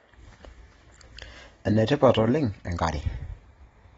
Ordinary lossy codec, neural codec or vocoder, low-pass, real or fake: AAC, 24 kbps; none; 10.8 kHz; real